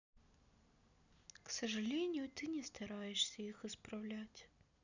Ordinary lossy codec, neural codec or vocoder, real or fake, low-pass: none; none; real; 7.2 kHz